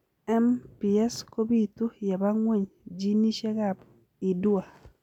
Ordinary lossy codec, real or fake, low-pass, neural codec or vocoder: none; real; 19.8 kHz; none